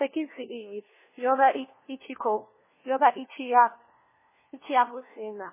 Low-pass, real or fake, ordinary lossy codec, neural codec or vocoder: 3.6 kHz; fake; MP3, 16 kbps; codec, 16 kHz, 1 kbps, FunCodec, trained on LibriTTS, 50 frames a second